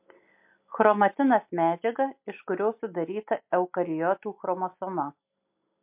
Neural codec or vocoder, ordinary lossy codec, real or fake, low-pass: none; MP3, 32 kbps; real; 3.6 kHz